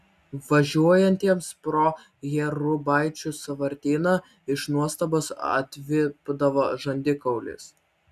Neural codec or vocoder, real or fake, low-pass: none; real; 14.4 kHz